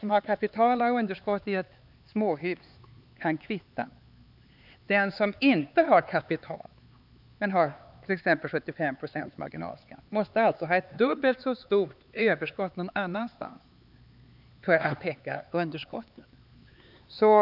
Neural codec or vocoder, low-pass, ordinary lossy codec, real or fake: codec, 16 kHz, 4 kbps, X-Codec, HuBERT features, trained on LibriSpeech; 5.4 kHz; none; fake